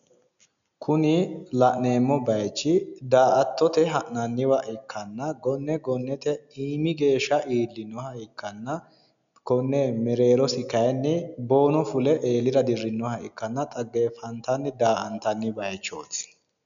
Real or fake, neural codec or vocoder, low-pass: real; none; 7.2 kHz